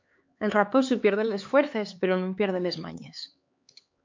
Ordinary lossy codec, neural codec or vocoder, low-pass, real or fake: MP3, 48 kbps; codec, 16 kHz, 4 kbps, X-Codec, HuBERT features, trained on LibriSpeech; 7.2 kHz; fake